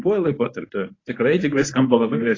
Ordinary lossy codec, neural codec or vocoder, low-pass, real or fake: AAC, 32 kbps; codec, 24 kHz, 0.9 kbps, WavTokenizer, medium speech release version 1; 7.2 kHz; fake